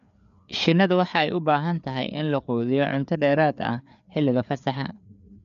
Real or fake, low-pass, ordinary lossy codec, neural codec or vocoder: fake; 7.2 kHz; none; codec, 16 kHz, 4 kbps, FreqCodec, larger model